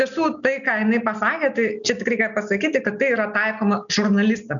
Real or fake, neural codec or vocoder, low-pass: real; none; 7.2 kHz